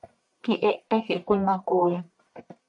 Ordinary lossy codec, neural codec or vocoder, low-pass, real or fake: MP3, 96 kbps; codec, 44.1 kHz, 1.7 kbps, Pupu-Codec; 10.8 kHz; fake